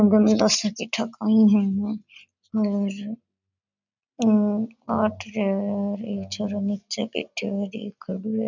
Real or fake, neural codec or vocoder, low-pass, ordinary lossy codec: real; none; 7.2 kHz; none